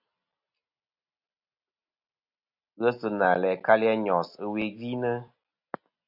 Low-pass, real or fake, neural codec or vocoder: 5.4 kHz; real; none